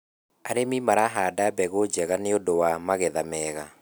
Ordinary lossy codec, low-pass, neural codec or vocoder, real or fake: none; none; none; real